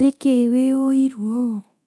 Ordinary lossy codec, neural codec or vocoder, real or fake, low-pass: none; codec, 24 kHz, 0.9 kbps, DualCodec; fake; none